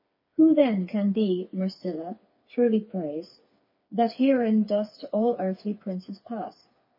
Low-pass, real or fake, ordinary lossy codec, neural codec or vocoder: 5.4 kHz; fake; MP3, 24 kbps; codec, 16 kHz, 4 kbps, FreqCodec, smaller model